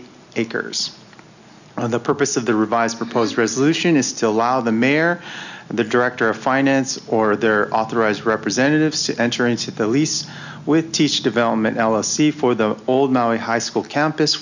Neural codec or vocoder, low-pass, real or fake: none; 7.2 kHz; real